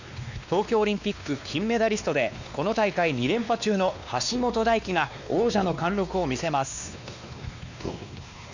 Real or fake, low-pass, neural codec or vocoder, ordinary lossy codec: fake; 7.2 kHz; codec, 16 kHz, 2 kbps, X-Codec, WavLM features, trained on Multilingual LibriSpeech; none